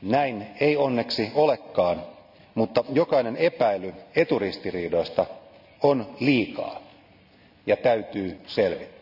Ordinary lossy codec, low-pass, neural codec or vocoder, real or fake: none; 5.4 kHz; none; real